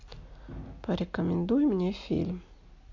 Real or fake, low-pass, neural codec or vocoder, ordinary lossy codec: real; 7.2 kHz; none; MP3, 48 kbps